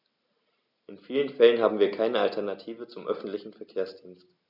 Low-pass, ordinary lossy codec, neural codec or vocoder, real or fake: 5.4 kHz; none; none; real